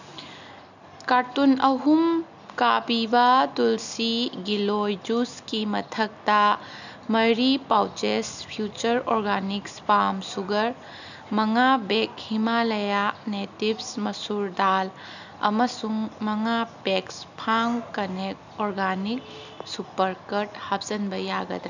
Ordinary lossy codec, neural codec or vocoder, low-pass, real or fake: none; none; 7.2 kHz; real